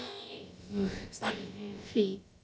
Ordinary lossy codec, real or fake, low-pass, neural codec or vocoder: none; fake; none; codec, 16 kHz, about 1 kbps, DyCAST, with the encoder's durations